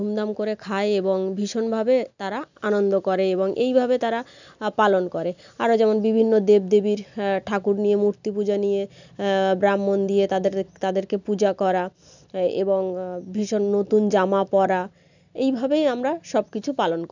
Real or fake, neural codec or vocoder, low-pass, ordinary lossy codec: real; none; 7.2 kHz; none